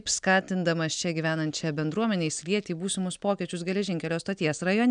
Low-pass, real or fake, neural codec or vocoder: 9.9 kHz; real; none